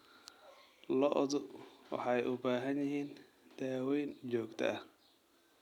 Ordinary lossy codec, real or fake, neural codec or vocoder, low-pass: none; real; none; 19.8 kHz